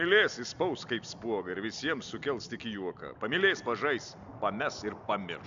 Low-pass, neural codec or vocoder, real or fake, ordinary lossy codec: 7.2 kHz; none; real; AAC, 64 kbps